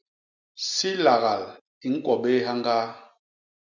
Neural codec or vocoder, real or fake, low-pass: none; real; 7.2 kHz